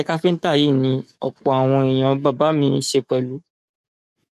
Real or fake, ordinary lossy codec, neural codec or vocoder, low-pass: fake; none; codec, 44.1 kHz, 7.8 kbps, DAC; 14.4 kHz